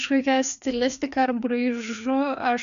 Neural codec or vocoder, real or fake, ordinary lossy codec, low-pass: codec, 16 kHz, 2 kbps, FreqCodec, larger model; fake; AAC, 96 kbps; 7.2 kHz